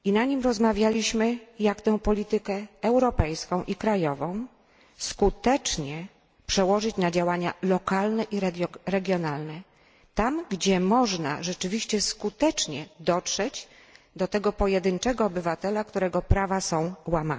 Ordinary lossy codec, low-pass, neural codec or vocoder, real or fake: none; none; none; real